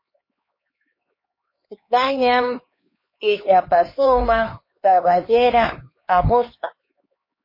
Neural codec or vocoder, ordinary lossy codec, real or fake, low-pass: codec, 16 kHz, 2 kbps, X-Codec, HuBERT features, trained on LibriSpeech; MP3, 24 kbps; fake; 5.4 kHz